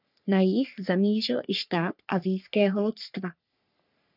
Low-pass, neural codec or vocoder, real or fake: 5.4 kHz; codec, 44.1 kHz, 3.4 kbps, Pupu-Codec; fake